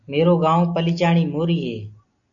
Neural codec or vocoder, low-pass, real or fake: none; 7.2 kHz; real